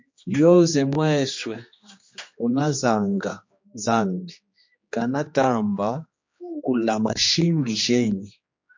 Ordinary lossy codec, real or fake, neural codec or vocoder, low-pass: MP3, 48 kbps; fake; codec, 16 kHz, 2 kbps, X-Codec, HuBERT features, trained on general audio; 7.2 kHz